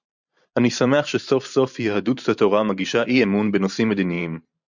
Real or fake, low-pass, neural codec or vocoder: fake; 7.2 kHz; vocoder, 44.1 kHz, 128 mel bands every 512 samples, BigVGAN v2